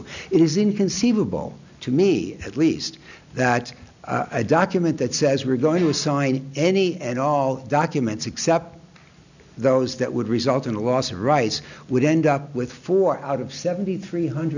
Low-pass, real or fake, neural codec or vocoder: 7.2 kHz; real; none